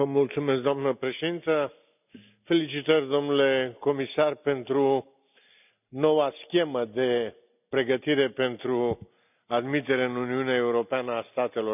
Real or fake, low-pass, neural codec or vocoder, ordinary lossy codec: real; 3.6 kHz; none; none